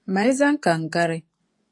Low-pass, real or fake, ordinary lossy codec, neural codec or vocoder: 10.8 kHz; fake; AAC, 48 kbps; vocoder, 24 kHz, 100 mel bands, Vocos